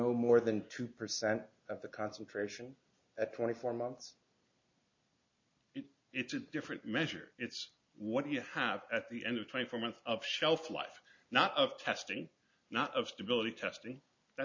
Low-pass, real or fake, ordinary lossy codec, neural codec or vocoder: 7.2 kHz; real; MP3, 48 kbps; none